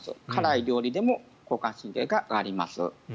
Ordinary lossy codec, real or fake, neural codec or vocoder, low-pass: none; real; none; none